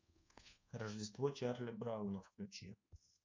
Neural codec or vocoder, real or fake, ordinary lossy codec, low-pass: codec, 24 kHz, 1.2 kbps, DualCodec; fake; MP3, 64 kbps; 7.2 kHz